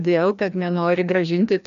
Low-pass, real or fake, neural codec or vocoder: 7.2 kHz; fake; codec, 16 kHz, 1 kbps, FreqCodec, larger model